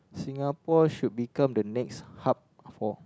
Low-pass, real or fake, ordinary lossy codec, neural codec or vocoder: none; real; none; none